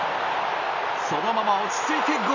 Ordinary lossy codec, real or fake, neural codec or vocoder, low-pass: none; real; none; 7.2 kHz